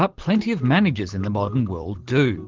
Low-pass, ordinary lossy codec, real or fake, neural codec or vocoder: 7.2 kHz; Opus, 16 kbps; real; none